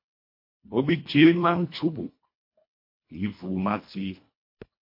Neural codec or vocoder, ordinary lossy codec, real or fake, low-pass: codec, 24 kHz, 1.5 kbps, HILCodec; MP3, 32 kbps; fake; 5.4 kHz